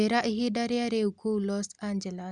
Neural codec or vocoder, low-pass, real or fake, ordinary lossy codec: none; 10.8 kHz; real; Opus, 64 kbps